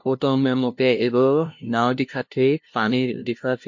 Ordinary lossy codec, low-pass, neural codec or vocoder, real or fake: MP3, 48 kbps; 7.2 kHz; codec, 16 kHz, 0.5 kbps, FunCodec, trained on LibriTTS, 25 frames a second; fake